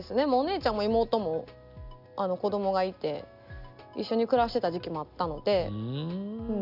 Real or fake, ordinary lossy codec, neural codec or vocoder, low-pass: real; none; none; 5.4 kHz